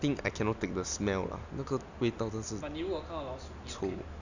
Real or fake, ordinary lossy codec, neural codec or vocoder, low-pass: real; none; none; 7.2 kHz